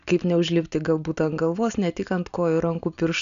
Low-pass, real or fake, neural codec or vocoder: 7.2 kHz; real; none